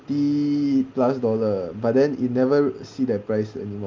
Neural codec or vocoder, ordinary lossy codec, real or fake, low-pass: none; Opus, 32 kbps; real; 7.2 kHz